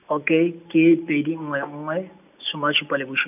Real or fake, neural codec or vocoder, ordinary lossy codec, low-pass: real; none; none; 3.6 kHz